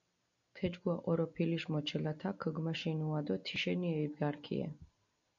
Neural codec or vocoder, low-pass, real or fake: none; 7.2 kHz; real